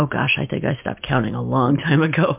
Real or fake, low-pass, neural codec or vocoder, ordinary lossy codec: real; 3.6 kHz; none; MP3, 32 kbps